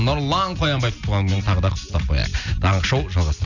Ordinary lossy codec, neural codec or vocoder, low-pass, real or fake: none; none; 7.2 kHz; real